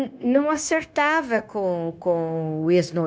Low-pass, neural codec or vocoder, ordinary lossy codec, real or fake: none; codec, 16 kHz, 0.9 kbps, LongCat-Audio-Codec; none; fake